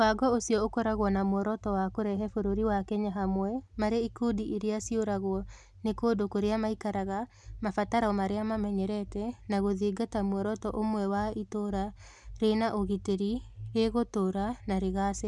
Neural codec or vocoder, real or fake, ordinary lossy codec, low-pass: none; real; none; none